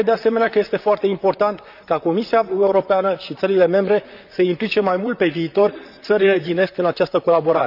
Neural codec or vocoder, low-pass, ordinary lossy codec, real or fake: vocoder, 44.1 kHz, 128 mel bands, Pupu-Vocoder; 5.4 kHz; none; fake